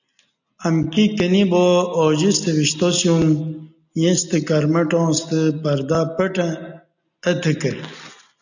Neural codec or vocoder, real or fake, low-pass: none; real; 7.2 kHz